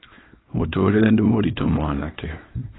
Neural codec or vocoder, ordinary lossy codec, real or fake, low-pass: codec, 24 kHz, 0.9 kbps, WavTokenizer, small release; AAC, 16 kbps; fake; 7.2 kHz